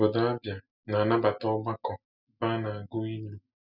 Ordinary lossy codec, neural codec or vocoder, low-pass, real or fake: none; none; 5.4 kHz; real